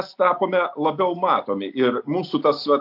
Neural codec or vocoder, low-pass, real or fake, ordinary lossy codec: none; 5.4 kHz; real; AAC, 48 kbps